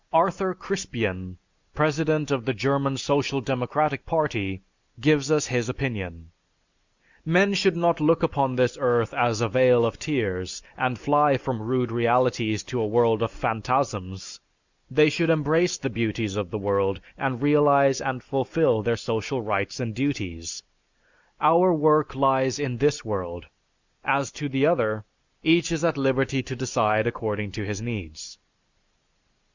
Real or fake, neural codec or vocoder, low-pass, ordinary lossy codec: real; none; 7.2 kHz; Opus, 64 kbps